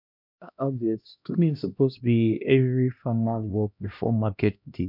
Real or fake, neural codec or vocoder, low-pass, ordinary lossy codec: fake; codec, 16 kHz, 1 kbps, X-Codec, HuBERT features, trained on LibriSpeech; 5.4 kHz; none